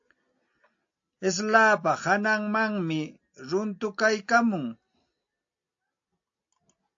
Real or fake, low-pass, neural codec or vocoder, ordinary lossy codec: real; 7.2 kHz; none; AAC, 48 kbps